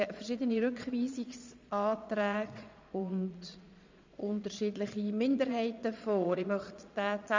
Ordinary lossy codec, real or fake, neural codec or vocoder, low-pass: MP3, 64 kbps; fake; vocoder, 22.05 kHz, 80 mel bands, Vocos; 7.2 kHz